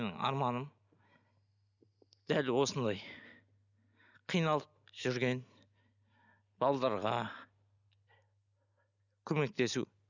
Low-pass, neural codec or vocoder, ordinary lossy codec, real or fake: 7.2 kHz; none; none; real